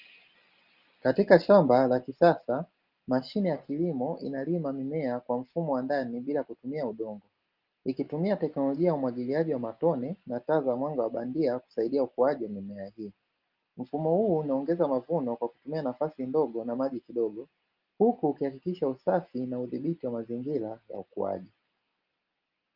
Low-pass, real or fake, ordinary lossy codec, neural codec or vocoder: 5.4 kHz; real; Opus, 32 kbps; none